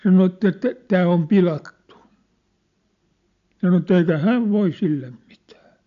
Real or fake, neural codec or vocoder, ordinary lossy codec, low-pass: real; none; AAC, 64 kbps; 7.2 kHz